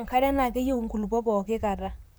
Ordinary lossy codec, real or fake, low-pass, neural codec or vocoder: none; fake; none; vocoder, 44.1 kHz, 128 mel bands, Pupu-Vocoder